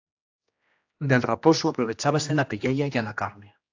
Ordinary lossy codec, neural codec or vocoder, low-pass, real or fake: AAC, 48 kbps; codec, 16 kHz, 1 kbps, X-Codec, HuBERT features, trained on general audio; 7.2 kHz; fake